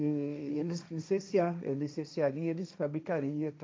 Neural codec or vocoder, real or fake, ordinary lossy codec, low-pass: codec, 16 kHz, 1.1 kbps, Voila-Tokenizer; fake; none; none